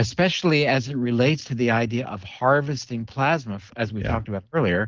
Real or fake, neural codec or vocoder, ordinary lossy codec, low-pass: real; none; Opus, 16 kbps; 7.2 kHz